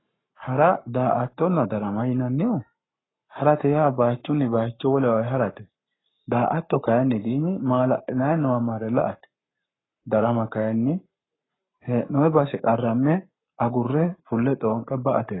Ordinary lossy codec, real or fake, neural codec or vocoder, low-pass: AAC, 16 kbps; fake; codec, 44.1 kHz, 7.8 kbps, Pupu-Codec; 7.2 kHz